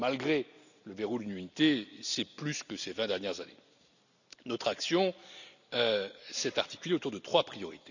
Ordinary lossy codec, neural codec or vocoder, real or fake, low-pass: none; none; real; 7.2 kHz